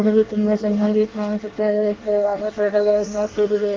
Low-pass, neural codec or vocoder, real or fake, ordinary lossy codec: 7.2 kHz; codec, 24 kHz, 1 kbps, SNAC; fake; Opus, 16 kbps